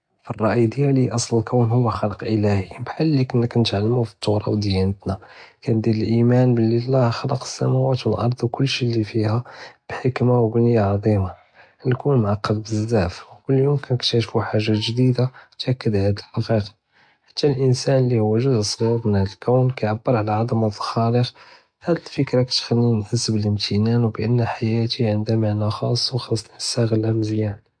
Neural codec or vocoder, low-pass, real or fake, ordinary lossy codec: none; 9.9 kHz; real; MP3, 64 kbps